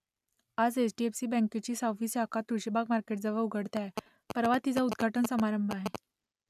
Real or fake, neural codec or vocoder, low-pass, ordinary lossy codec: real; none; 14.4 kHz; none